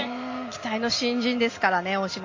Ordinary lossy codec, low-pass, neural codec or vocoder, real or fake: MP3, 32 kbps; 7.2 kHz; none; real